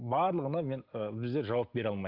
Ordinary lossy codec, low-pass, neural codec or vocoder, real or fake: Opus, 32 kbps; 5.4 kHz; none; real